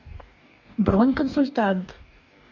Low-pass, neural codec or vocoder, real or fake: 7.2 kHz; codec, 44.1 kHz, 2.6 kbps, DAC; fake